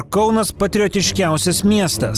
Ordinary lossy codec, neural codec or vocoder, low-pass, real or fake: Opus, 24 kbps; none; 14.4 kHz; real